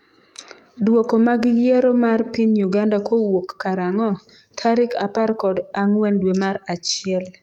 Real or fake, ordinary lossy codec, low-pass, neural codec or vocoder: fake; none; 19.8 kHz; codec, 44.1 kHz, 7.8 kbps, DAC